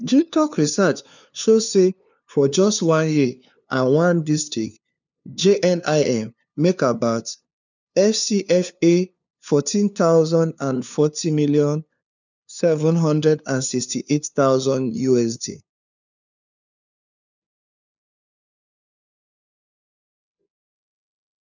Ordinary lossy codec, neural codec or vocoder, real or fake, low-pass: none; codec, 16 kHz, 2 kbps, FunCodec, trained on LibriTTS, 25 frames a second; fake; 7.2 kHz